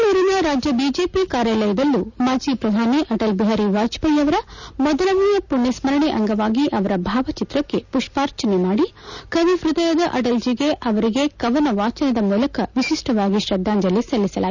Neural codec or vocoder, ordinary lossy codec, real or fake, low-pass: none; none; real; 7.2 kHz